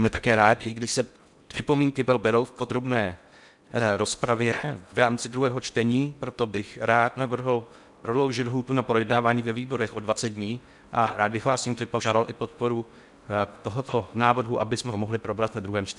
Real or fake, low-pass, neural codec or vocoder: fake; 10.8 kHz; codec, 16 kHz in and 24 kHz out, 0.6 kbps, FocalCodec, streaming, 4096 codes